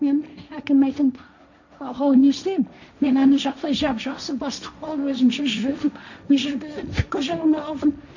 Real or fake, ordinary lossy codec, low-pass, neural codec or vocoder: fake; none; 7.2 kHz; codec, 16 kHz, 1.1 kbps, Voila-Tokenizer